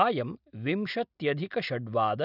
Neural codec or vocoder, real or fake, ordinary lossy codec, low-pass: none; real; none; 5.4 kHz